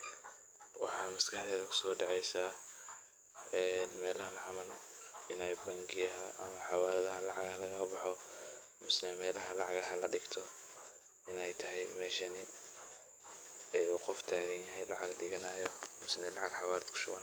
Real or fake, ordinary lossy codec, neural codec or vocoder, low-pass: fake; none; codec, 44.1 kHz, 7.8 kbps, DAC; none